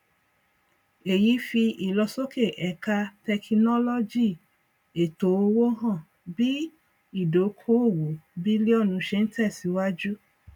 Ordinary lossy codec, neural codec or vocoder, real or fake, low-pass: none; none; real; 19.8 kHz